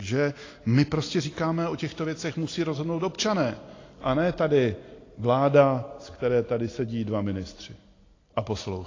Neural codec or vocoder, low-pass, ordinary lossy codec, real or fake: none; 7.2 kHz; AAC, 32 kbps; real